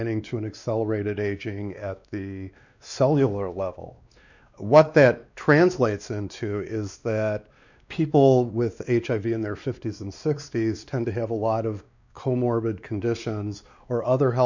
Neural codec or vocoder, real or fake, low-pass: codec, 16 kHz, 2 kbps, X-Codec, WavLM features, trained on Multilingual LibriSpeech; fake; 7.2 kHz